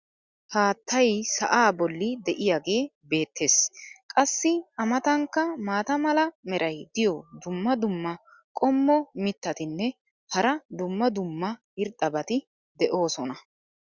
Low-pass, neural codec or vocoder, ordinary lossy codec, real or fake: 7.2 kHz; none; Opus, 64 kbps; real